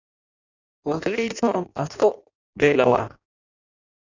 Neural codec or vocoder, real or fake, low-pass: codec, 16 kHz in and 24 kHz out, 0.6 kbps, FireRedTTS-2 codec; fake; 7.2 kHz